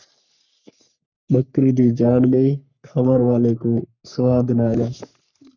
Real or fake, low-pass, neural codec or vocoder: fake; 7.2 kHz; codec, 44.1 kHz, 3.4 kbps, Pupu-Codec